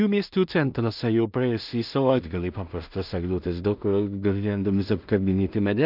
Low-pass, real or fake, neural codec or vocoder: 5.4 kHz; fake; codec, 16 kHz in and 24 kHz out, 0.4 kbps, LongCat-Audio-Codec, two codebook decoder